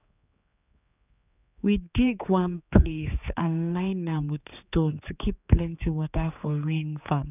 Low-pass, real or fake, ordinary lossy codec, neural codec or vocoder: 3.6 kHz; fake; none; codec, 16 kHz, 4 kbps, X-Codec, HuBERT features, trained on general audio